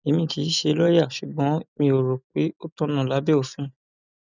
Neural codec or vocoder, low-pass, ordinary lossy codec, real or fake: none; 7.2 kHz; none; real